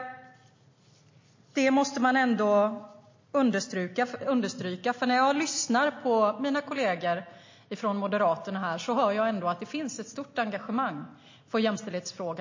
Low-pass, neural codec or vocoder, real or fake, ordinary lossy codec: 7.2 kHz; none; real; MP3, 32 kbps